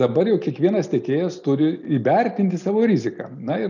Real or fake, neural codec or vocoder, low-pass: real; none; 7.2 kHz